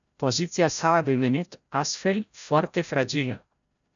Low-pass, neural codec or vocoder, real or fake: 7.2 kHz; codec, 16 kHz, 0.5 kbps, FreqCodec, larger model; fake